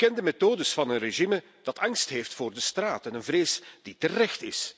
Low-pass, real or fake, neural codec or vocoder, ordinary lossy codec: none; real; none; none